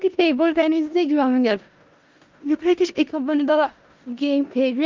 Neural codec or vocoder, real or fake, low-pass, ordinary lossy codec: codec, 16 kHz in and 24 kHz out, 0.4 kbps, LongCat-Audio-Codec, four codebook decoder; fake; 7.2 kHz; Opus, 24 kbps